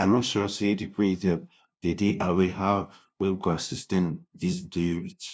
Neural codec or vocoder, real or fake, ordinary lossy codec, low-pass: codec, 16 kHz, 0.5 kbps, FunCodec, trained on LibriTTS, 25 frames a second; fake; none; none